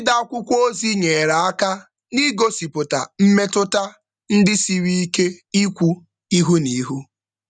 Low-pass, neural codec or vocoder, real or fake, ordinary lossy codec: 9.9 kHz; none; real; none